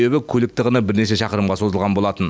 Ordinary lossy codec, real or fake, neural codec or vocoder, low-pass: none; real; none; none